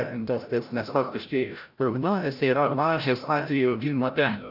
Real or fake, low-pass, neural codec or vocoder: fake; 5.4 kHz; codec, 16 kHz, 0.5 kbps, FreqCodec, larger model